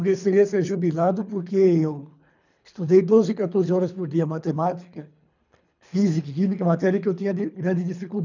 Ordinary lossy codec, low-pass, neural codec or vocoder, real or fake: none; 7.2 kHz; codec, 24 kHz, 3 kbps, HILCodec; fake